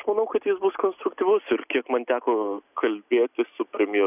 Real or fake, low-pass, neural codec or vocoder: real; 3.6 kHz; none